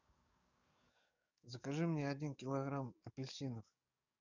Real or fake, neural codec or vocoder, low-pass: fake; codec, 44.1 kHz, 7.8 kbps, DAC; 7.2 kHz